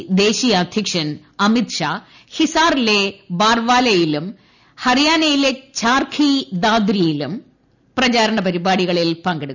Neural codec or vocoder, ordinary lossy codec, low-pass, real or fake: none; none; 7.2 kHz; real